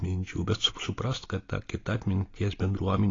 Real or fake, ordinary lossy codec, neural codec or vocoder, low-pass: real; AAC, 32 kbps; none; 7.2 kHz